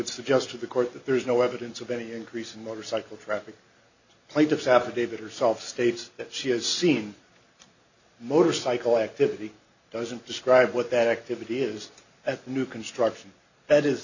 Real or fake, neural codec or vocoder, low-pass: real; none; 7.2 kHz